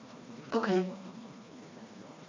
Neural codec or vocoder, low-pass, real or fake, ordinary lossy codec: codec, 16 kHz, 2 kbps, FreqCodec, smaller model; 7.2 kHz; fake; MP3, 64 kbps